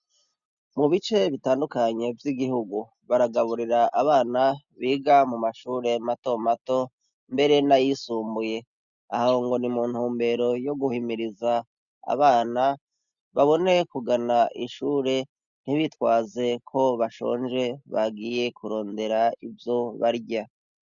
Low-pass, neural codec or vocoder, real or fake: 7.2 kHz; none; real